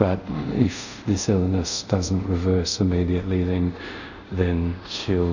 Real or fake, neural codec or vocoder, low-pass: fake; codec, 24 kHz, 0.5 kbps, DualCodec; 7.2 kHz